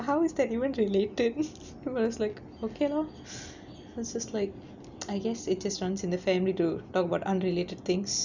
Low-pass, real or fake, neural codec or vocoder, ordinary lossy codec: 7.2 kHz; real; none; none